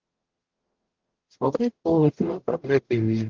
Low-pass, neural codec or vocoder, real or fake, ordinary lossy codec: 7.2 kHz; codec, 44.1 kHz, 0.9 kbps, DAC; fake; Opus, 16 kbps